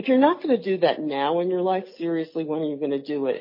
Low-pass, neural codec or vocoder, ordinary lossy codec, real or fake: 5.4 kHz; none; MP3, 24 kbps; real